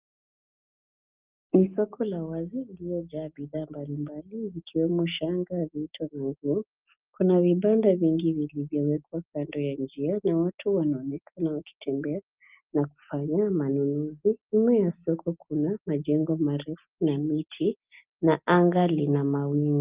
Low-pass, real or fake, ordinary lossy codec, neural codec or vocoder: 3.6 kHz; real; Opus, 24 kbps; none